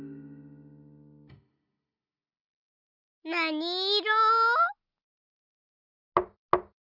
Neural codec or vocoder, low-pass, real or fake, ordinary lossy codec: none; 5.4 kHz; real; none